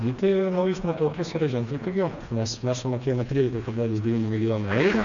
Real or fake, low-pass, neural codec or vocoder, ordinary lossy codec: fake; 7.2 kHz; codec, 16 kHz, 2 kbps, FreqCodec, smaller model; MP3, 96 kbps